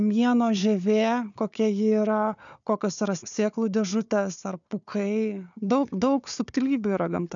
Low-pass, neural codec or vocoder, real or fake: 7.2 kHz; codec, 16 kHz, 4 kbps, FunCodec, trained on Chinese and English, 50 frames a second; fake